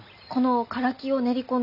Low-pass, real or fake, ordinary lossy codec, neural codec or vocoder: 5.4 kHz; real; none; none